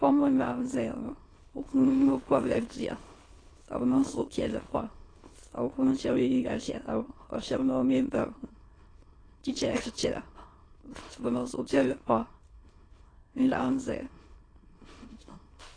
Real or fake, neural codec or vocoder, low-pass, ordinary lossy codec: fake; autoencoder, 22.05 kHz, a latent of 192 numbers a frame, VITS, trained on many speakers; 9.9 kHz; AAC, 32 kbps